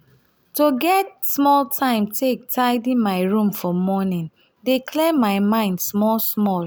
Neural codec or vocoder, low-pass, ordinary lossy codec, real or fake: none; none; none; real